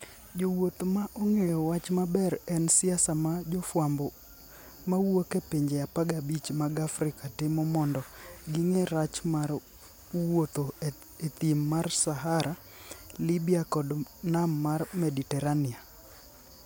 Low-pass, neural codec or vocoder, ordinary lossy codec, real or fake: none; none; none; real